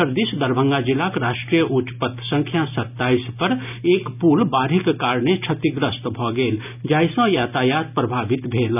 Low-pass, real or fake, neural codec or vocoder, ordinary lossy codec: 3.6 kHz; real; none; none